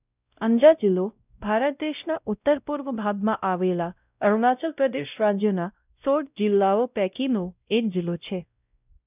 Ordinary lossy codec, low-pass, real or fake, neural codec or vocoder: none; 3.6 kHz; fake; codec, 16 kHz, 0.5 kbps, X-Codec, WavLM features, trained on Multilingual LibriSpeech